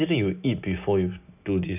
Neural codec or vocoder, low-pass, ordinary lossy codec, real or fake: none; 3.6 kHz; none; real